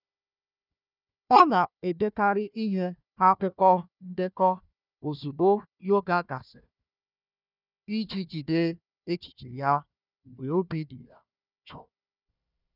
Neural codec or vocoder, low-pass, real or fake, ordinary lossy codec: codec, 16 kHz, 1 kbps, FunCodec, trained on Chinese and English, 50 frames a second; 5.4 kHz; fake; none